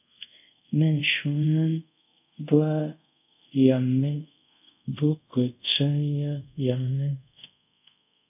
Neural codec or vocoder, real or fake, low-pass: codec, 24 kHz, 0.5 kbps, DualCodec; fake; 3.6 kHz